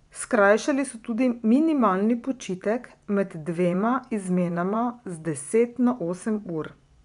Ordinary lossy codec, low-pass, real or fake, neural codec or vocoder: none; 10.8 kHz; fake; vocoder, 24 kHz, 100 mel bands, Vocos